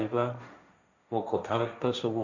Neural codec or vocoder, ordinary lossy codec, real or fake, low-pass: codec, 16 kHz, 1.1 kbps, Voila-Tokenizer; none; fake; 7.2 kHz